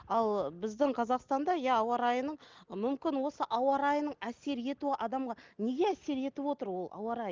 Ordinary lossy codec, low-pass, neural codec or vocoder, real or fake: Opus, 32 kbps; 7.2 kHz; none; real